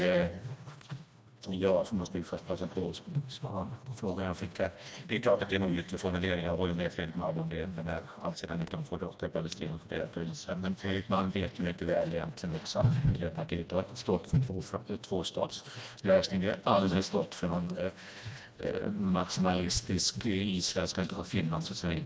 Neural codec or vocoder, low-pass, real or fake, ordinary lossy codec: codec, 16 kHz, 1 kbps, FreqCodec, smaller model; none; fake; none